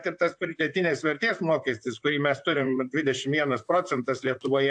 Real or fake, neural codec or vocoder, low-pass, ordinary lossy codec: fake; vocoder, 44.1 kHz, 128 mel bands, Pupu-Vocoder; 9.9 kHz; AAC, 64 kbps